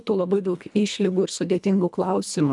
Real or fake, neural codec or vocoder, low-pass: fake; codec, 24 kHz, 1.5 kbps, HILCodec; 10.8 kHz